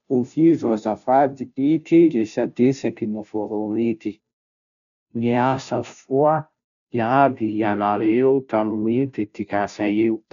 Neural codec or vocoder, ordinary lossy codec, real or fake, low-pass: codec, 16 kHz, 0.5 kbps, FunCodec, trained on Chinese and English, 25 frames a second; none; fake; 7.2 kHz